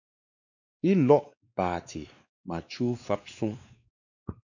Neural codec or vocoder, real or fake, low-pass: codec, 16 kHz, 2 kbps, X-Codec, WavLM features, trained on Multilingual LibriSpeech; fake; 7.2 kHz